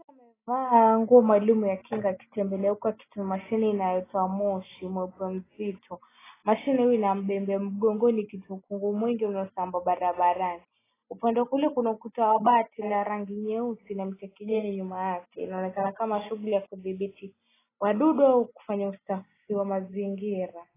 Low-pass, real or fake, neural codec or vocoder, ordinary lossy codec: 3.6 kHz; real; none; AAC, 16 kbps